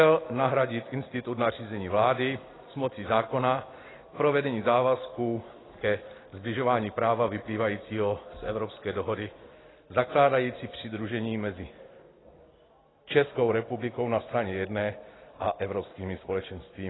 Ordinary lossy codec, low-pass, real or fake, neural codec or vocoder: AAC, 16 kbps; 7.2 kHz; real; none